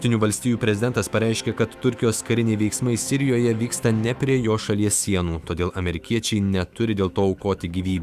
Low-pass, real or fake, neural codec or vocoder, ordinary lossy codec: 14.4 kHz; fake; autoencoder, 48 kHz, 128 numbers a frame, DAC-VAE, trained on Japanese speech; Opus, 64 kbps